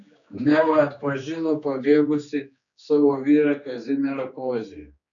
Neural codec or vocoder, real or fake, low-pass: codec, 16 kHz, 2 kbps, X-Codec, HuBERT features, trained on general audio; fake; 7.2 kHz